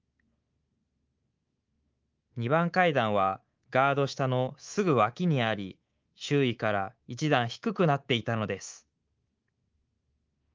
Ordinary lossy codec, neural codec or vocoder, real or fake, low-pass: Opus, 32 kbps; codec, 24 kHz, 3.1 kbps, DualCodec; fake; 7.2 kHz